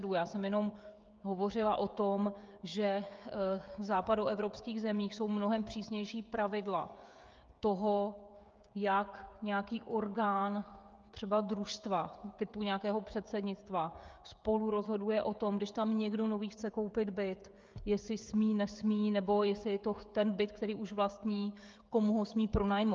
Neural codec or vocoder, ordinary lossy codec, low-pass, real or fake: codec, 16 kHz, 16 kbps, FreqCodec, smaller model; Opus, 24 kbps; 7.2 kHz; fake